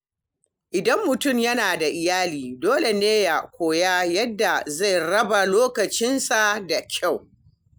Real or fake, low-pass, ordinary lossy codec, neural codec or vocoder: real; none; none; none